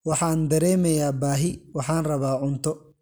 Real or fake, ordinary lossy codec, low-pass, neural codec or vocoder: real; none; none; none